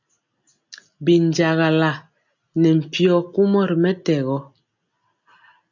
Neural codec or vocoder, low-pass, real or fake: none; 7.2 kHz; real